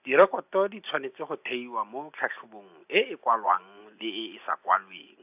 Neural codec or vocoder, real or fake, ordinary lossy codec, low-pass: none; real; AAC, 32 kbps; 3.6 kHz